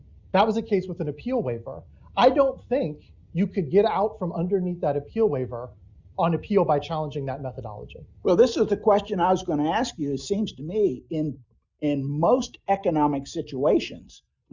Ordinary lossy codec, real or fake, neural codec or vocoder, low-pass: Opus, 64 kbps; real; none; 7.2 kHz